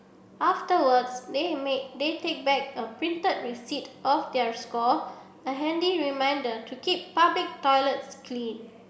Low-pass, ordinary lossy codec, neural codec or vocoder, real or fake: none; none; none; real